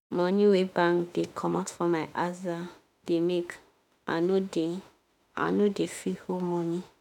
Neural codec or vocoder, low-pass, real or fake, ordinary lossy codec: autoencoder, 48 kHz, 32 numbers a frame, DAC-VAE, trained on Japanese speech; 19.8 kHz; fake; none